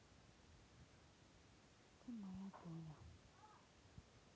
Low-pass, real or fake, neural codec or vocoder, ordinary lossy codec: none; real; none; none